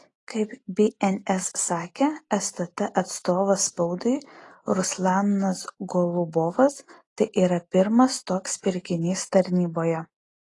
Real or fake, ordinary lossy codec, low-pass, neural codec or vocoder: real; AAC, 32 kbps; 10.8 kHz; none